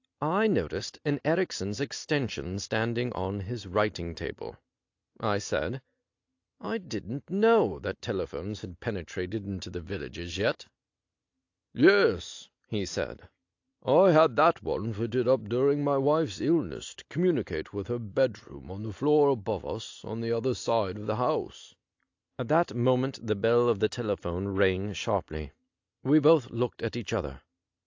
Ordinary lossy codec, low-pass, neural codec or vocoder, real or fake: AAC, 48 kbps; 7.2 kHz; none; real